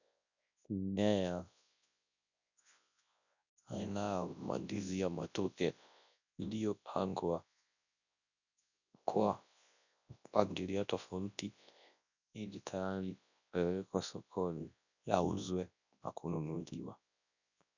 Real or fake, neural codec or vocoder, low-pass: fake; codec, 24 kHz, 0.9 kbps, WavTokenizer, large speech release; 7.2 kHz